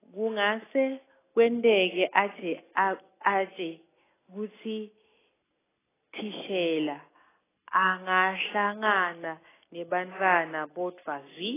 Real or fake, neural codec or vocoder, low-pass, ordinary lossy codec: real; none; 3.6 kHz; AAC, 16 kbps